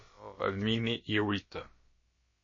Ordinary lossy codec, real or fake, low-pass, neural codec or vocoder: MP3, 32 kbps; fake; 7.2 kHz; codec, 16 kHz, about 1 kbps, DyCAST, with the encoder's durations